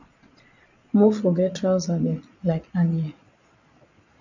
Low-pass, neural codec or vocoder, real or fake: 7.2 kHz; vocoder, 22.05 kHz, 80 mel bands, Vocos; fake